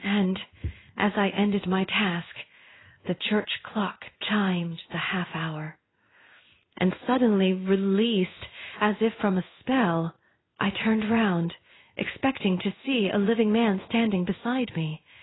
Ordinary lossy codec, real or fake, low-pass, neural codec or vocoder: AAC, 16 kbps; fake; 7.2 kHz; codec, 16 kHz in and 24 kHz out, 1 kbps, XY-Tokenizer